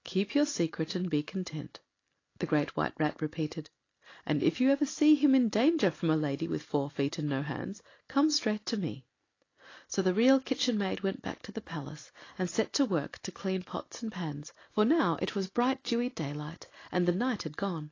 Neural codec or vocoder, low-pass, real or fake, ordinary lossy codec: none; 7.2 kHz; real; AAC, 32 kbps